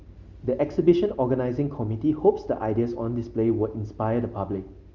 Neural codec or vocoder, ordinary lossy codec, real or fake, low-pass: none; Opus, 32 kbps; real; 7.2 kHz